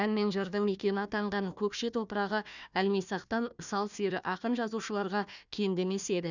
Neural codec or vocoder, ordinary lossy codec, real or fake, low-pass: codec, 16 kHz, 1 kbps, FunCodec, trained on Chinese and English, 50 frames a second; none; fake; 7.2 kHz